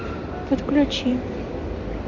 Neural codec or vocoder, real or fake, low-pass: none; real; 7.2 kHz